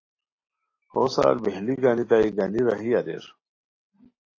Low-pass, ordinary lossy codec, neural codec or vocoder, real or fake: 7.2 kHz; AAC, 32 kbps; none; real